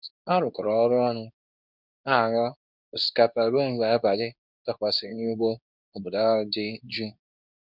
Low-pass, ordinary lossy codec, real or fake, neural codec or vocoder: 5.4 kHz; none; fake; codec, 24 kHz, 0.9 kbps, WavTokenizer, medium speech release version 2